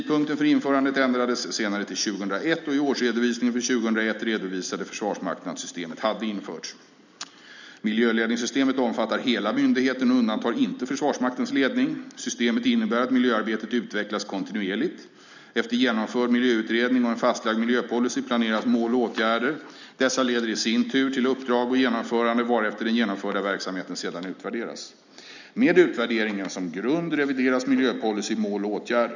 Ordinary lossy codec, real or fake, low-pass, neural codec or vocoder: none; real; 7.2 kHz; none